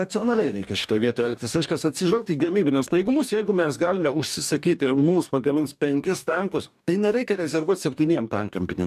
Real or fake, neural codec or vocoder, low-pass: fake; codec, 44.1 kHz, 2.6 kbps, DAC; 14.4 kHz